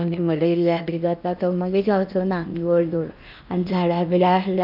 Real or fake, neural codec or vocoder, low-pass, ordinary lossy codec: fake; codec, 16 kHz in and 24 kHz out, 0.8 kbps, FocalCodec, streaming, 65536 codes; 5.4 kHz; none